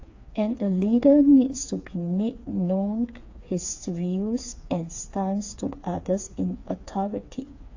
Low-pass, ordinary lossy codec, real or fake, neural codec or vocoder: 7.2 kHz; AAC, 48 kbps; fake; codec, 16 kHz, 4 kbps, FreqCodec, smaller model